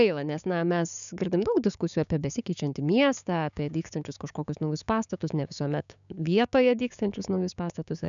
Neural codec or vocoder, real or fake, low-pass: codec, 16 kHz, 6 kbps, DAC; fake; 7.2 kHz